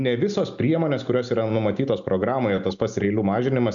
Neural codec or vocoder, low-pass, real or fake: none; 7.2 kHz; real